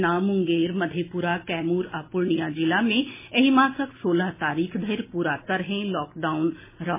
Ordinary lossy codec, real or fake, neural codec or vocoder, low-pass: MP3, 16 kbps; real; none; 3.6 kHz